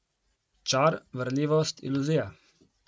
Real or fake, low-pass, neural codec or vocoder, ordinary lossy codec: real; none; none; none